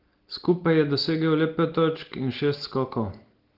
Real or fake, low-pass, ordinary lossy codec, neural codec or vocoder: real; 5.4 kHz; Opus, 16 kbps; none